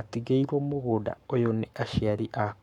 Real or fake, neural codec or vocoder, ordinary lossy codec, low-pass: fake; codec, 44.1 kHz, 7.8 kbps, Pupu-Codec; none; 19.8 kHz